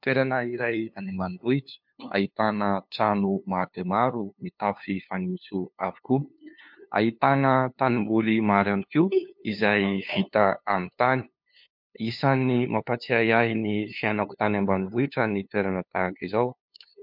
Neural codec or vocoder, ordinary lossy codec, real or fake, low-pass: codec, 16 kHz, 2 kbps, FunCodec, trained on LibriTTS, 25 frames a second; MP3, 48 kbps; fake; 5.4 kHz